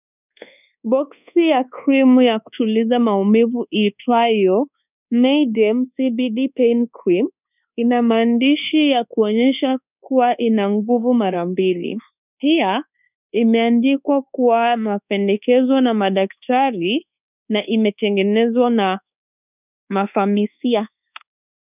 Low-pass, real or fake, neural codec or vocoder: 3.6 kHz; fake; codec, 24 kHz, 1.2 kbps, DualCodec